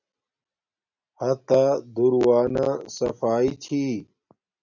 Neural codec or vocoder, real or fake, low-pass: none; real; 7.2 kHz